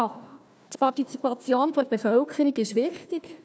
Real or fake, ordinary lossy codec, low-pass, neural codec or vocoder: fake; none; none; codec, 16 kHz, 1 kbps, FunCodec, trained on Chinese and English, 50 frames a second